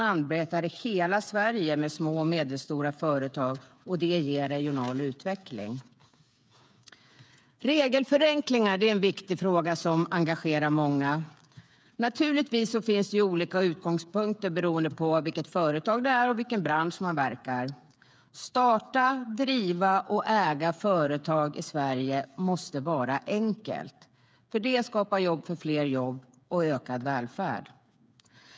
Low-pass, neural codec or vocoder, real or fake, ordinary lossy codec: none; codec, 16 kHz, 8 kbps, FreqCodec, smaller model; fake; none